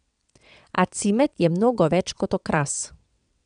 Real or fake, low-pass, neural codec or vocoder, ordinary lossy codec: fake; 9.9 kHz; vocoder, 22.05 kHz, 80 mel bands, WaveNeXt; none